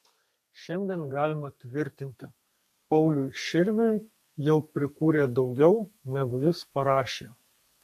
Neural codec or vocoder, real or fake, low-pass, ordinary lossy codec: codec, 32 kHz, 1.9 kbps, SNAC; fake; 14.4 kHz; MP3, 64 kbps